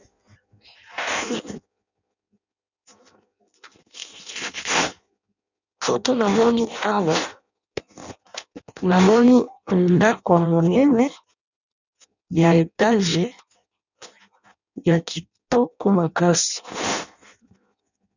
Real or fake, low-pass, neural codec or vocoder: fake; 7.2 kHz; codec, 16 kHz in and 24 kHz out, 0.6 kbps, FireRedTTS-2 codec